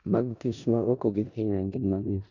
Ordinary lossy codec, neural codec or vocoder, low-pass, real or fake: none; codec, 16 kHz in and 24 kHz out, 0.4 kbps, LongCat-Audio-Codec, four codebook decoder; 7.2 kHz; fake